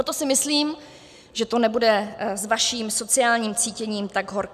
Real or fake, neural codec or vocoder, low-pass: real; none; 14.4 kHz